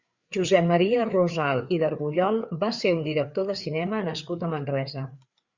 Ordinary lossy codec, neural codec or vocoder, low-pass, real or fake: Opus, 64 kbps; codec, 16 kHz, 4 kbps, FreqCodec, larger model; 7.2 kHz; fake